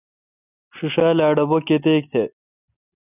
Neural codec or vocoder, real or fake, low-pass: none; real; 3.6 kHz